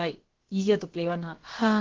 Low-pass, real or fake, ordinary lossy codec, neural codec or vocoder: 7.2 kHz; fake; Opus, 16 kbps; codec, 16 kHz, about 1 kbps, DyCAST, with the encoder's durations